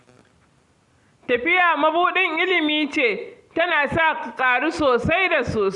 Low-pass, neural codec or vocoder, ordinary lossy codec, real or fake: 10.8 kHz; none; Opus, 64 kbps; real